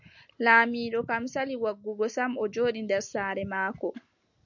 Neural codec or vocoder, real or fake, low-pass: none; real; 7.2 kHz